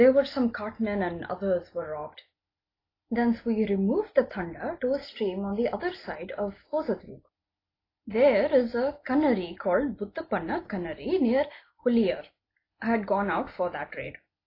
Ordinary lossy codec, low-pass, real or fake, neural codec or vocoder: AAC, 24 kbps; 5.4 kHz; real; none